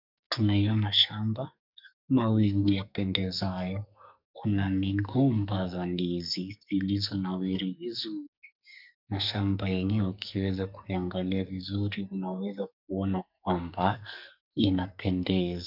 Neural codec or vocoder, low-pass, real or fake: codec, 44.1 kHz, 2.6 kbps, SNAC; 5.4 kHz; fake